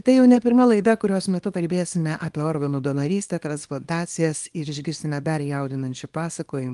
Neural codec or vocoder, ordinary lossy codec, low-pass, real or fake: codec, 24 kHz, 0.9 kbps, WavTokenizer, small release; Opus, 32 kbps; 10.8 kHz; fake